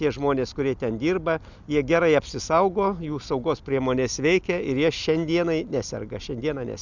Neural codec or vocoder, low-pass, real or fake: none; 7.2 kHz; real